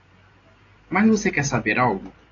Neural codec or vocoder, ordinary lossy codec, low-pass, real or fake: none; AAC, 32 kbps; 7.2 kHz; real